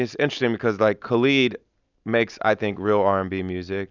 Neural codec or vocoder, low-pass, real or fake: none; 7.2 kHz; real